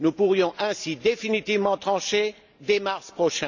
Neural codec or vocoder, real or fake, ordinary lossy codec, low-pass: none; real; none; 7.2 kHz